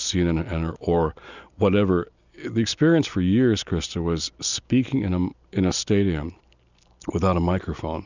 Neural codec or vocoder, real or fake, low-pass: none; real; 7.2 kHz